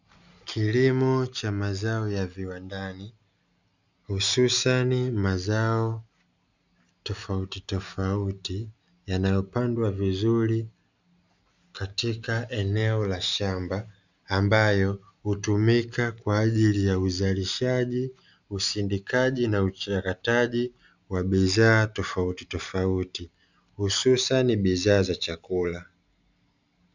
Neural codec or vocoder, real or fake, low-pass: none; real; 7.2 kHz